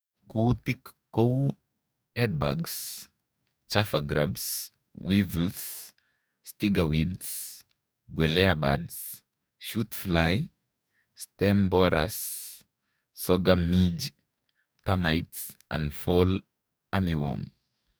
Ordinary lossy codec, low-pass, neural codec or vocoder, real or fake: none; none; codec, 44.1 kHz, 2.6 kbps, DAC; fake